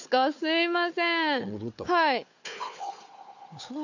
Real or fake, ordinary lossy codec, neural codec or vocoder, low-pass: fake; none; codec, 16 kHz, 4 kbps, FunCodec, trained on Chinese and English, 50 frames a second; 7.2 kHz